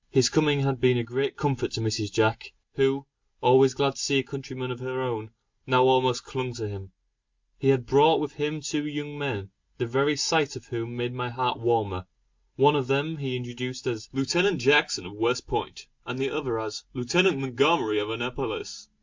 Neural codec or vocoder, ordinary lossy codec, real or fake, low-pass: none; MP3, 64 kbps; real; 7.2 kHz